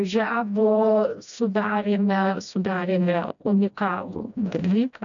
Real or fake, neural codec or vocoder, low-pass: fake; codec, 16 kHz, 1 kbps, FreqCodec, smaller model; 7.2 kHz